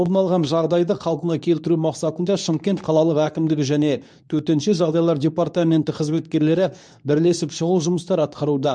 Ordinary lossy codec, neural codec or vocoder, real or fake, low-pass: none; codec, 24 kHz, 0.9 kbps, WavTokenizer, medium speech release version 2; fake; 9.9 kHz